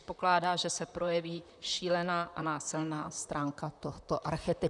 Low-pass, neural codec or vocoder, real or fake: 10.8 kHz; vocoder, 44.1 kHz, 128 mel bands, Pupu-Vocoder; fake